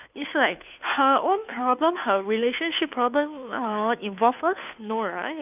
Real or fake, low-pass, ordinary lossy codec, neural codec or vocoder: fake; 3.6 kHz; none; codec, 16 kHz, 2 kbps, FunCodec, trained on Chinese and English, 25 frames a second